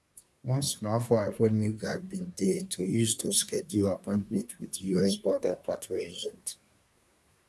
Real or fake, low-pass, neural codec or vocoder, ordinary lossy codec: fake; none; codec, 24 kHz, 1 kbps, SNAC; none